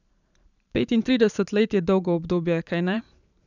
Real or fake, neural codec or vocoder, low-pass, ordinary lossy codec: fake; vocoder, 44.1 kHz, 128 mel bands every 512 samples, BigVGAN v2; 7.2 kHz; none